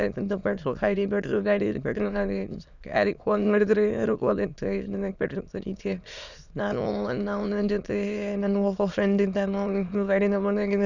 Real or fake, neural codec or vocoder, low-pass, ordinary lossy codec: fake; autoencoder, 22.05 kHz, a latent of 192 numbers a frame, VITS, trained on many speakers; 7.2 kHz; none